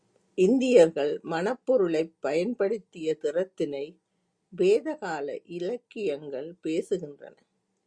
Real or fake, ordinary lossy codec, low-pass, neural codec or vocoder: real; Opus, 64 kbps; 9.9 kHz; none